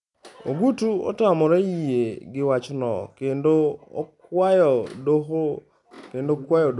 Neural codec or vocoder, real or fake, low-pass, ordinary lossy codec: none; real; 10.8 kHz; none